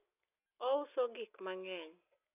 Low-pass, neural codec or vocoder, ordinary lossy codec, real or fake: 3.6 kHz; none; none; real